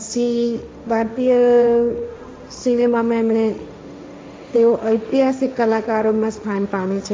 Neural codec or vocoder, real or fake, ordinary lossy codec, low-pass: codec, 16 kHz, 1.1 kbps, Voila-Tokenizer; fake; none; none